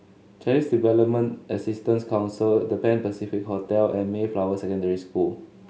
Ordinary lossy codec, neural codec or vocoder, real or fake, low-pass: none; none; real; none